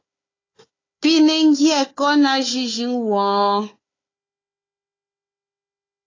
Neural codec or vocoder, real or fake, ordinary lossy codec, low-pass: codec, 16 kHz, 4 kbps, FunCodec, trained on Chinese and English, 50 frames a second; fake; AAC, 32 kbps; 7.2 kHz